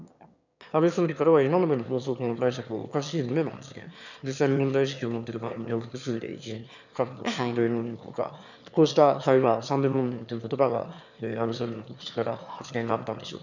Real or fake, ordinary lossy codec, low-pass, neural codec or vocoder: fake; none; 7.2 kHz; autoencoder, 22.05 kHz, a latent of 192 numbers a frame, VITS, trained on one speaker